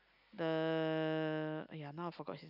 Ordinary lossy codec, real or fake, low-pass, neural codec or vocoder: none; real; 5.4 kHz; none